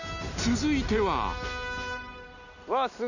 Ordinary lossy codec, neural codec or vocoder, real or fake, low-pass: none; none; real; 7.2 kHz